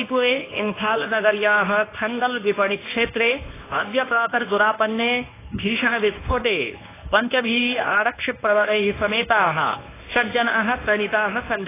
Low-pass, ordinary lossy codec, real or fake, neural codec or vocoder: 3.6 kHz; AAC, 16 kbps; fake; codec, 24 kHz, 0.9 kbps, WavTokenizer, medium speech release version 1